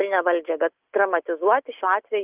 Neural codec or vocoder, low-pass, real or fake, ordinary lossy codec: none; 3.6 kHz; real; Opus, 32 kbps